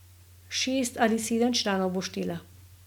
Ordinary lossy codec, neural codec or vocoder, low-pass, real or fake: none; none; 19.8 kHz; real